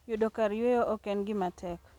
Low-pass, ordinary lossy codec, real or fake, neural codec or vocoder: 19.8 kHz; none; real; none